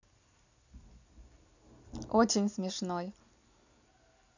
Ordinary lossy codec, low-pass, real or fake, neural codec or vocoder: none; 7.2 kHz; real; none